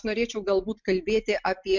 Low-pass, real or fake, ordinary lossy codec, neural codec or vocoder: 7.2 kHz; real; MP3, 64 kbps; none